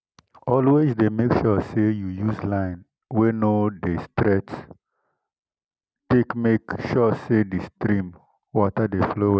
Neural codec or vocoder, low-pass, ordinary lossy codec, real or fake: none; none; none; real